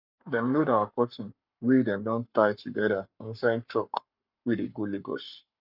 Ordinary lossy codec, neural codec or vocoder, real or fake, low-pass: none; codec, 44.1 kHz, 7.8 kbps, Pupu-Codec; fake; 5.4 kHz